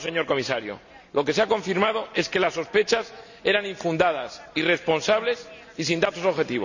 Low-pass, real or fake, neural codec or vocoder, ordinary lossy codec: 7.2 kHz; real; none; none